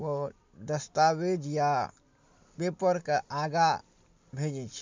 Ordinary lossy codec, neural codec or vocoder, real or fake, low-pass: MP3, 64 kbps; none; real; 7.2 kHz